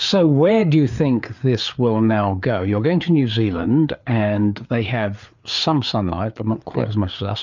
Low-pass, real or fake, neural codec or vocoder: 7.2 kHz; fake; codec, 16 kHz, 4 kbps, FreqCodec, larger model